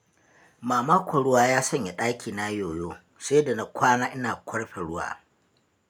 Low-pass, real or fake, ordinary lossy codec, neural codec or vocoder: none; real; none; none